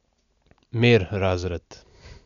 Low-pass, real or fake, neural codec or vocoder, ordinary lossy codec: 7.2 kHz; real; none; none